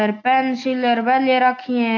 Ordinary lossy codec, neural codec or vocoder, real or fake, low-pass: AAC, 48 kbps; none; real; 7.2 kHz